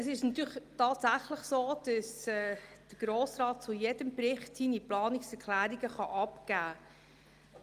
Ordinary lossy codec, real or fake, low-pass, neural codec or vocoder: Opus, 32 kbps; real; 14.4 kHz; none